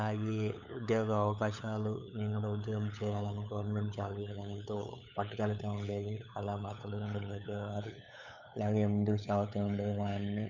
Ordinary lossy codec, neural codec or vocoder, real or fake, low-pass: none; codec, 16 kHz, 8 kbps, FunCodec, trained on LibriTTS, 25 frames a second; fake; 7.2 kHz